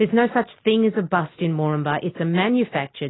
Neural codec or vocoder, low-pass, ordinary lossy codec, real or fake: none; 7.2 kHz; AAC, 16 kbps; real